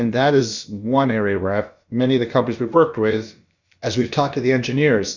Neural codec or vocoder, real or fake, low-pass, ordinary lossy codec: codec, 16 kHz, about 1 kbps, DyCAST, with the encoder's durations; fake; 7.2 kHz; Opus, 64 kbps